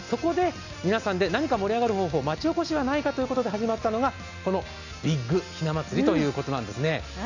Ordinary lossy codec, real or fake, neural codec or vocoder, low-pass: none; real; none; 7.2 kHz